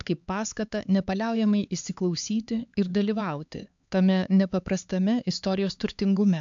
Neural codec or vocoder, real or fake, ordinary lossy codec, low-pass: codec, 16 kHz, 4 kbps, X-Codec, HuBERT features, trained on LibriSpeech; fake; AAC, 64 kbps; 7.2 kHz